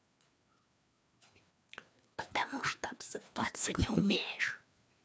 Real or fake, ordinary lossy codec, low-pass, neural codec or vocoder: fake; none; none; codec, 16 kHz, 2 kbps, FreqCodec, larger model